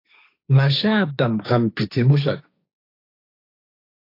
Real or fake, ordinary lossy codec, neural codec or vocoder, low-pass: fake; AAC, 32 kbps; codec, 16 kHz, 1.1 kbps, Voila-Tokenizer; 5.4 kHz